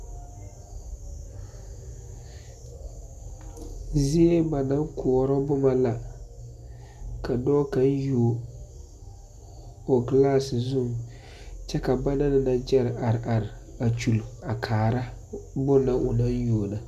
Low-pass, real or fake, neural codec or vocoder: 14.4 kHz; real; none